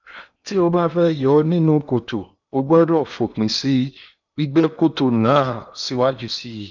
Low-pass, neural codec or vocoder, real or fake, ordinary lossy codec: 7.2 kHz; codec, 16 kHz in and 24 kHz out, 0.8 kbps, FocalCodec, streaming, 65536 codes; fake; none